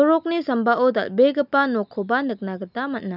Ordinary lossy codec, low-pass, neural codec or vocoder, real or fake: none; 5.4 kHz; none; real